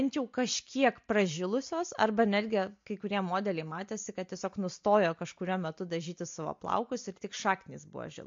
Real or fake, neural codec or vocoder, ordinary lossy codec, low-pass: real; none; MP3, 48 kbps; 7.2 kHz